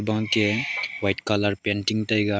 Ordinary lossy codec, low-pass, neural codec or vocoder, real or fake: none; none; none; real